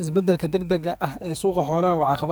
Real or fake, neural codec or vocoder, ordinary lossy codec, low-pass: fake; codec, 44.1 kHz, 2.6 kbps, SNAC; none; none